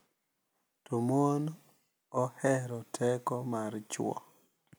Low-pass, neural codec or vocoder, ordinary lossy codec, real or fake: none; none; none; real